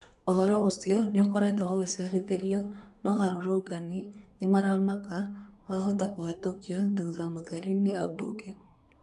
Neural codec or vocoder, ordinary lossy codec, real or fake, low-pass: codec, 24 kHz, 1 kbps, SNAC; none; fake; 10.8 kHz